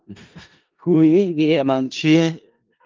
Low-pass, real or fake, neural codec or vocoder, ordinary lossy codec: 7.2 kHz; fake; codec, 16 kHz in and 24 kHz out, 0.4 kbps, LongCat-Audio-Codec, four codebook decoder; Opus, 32 kbps